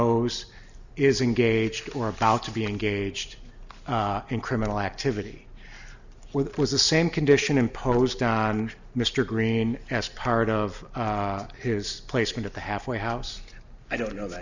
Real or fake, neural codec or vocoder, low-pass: real; none; 7.2 kHz